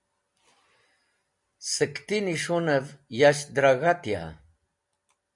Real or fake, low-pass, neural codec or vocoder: real; 10.8 kHz; none